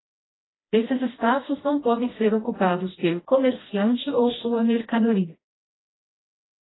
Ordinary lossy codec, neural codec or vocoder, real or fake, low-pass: AAC, 16 kbps; codec, 16 kHz, 1 kbps, FreqCodec, smaller model; fake; 7.2 kHz